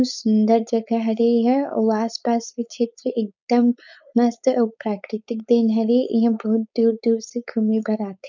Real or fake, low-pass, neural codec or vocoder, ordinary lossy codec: fake; 7.2 kHz; codec, 16 kHz, 4.8 kbps, FACodec; none